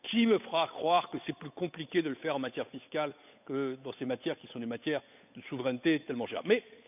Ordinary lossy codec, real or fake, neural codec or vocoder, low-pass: none; fake; codec, 16 kHz, 8 kbps, FunCodec, trained on Chinese and English, 25 frames a second; 3.6 kHz